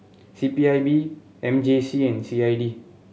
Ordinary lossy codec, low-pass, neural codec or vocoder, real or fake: none; none; none; real